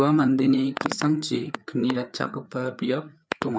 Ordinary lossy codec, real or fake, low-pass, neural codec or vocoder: none; fake; none; codec, 16 kHz, 4 kbps, FreqCodec, larger model